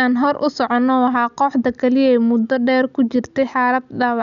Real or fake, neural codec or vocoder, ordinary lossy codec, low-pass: real; none; none; 7.2 kHz